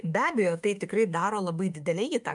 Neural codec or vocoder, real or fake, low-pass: autoencoder, 48 kHz, 32 numbers a frame, DAC-VAE, trained on Japanese speech; fake; 10.8 kHz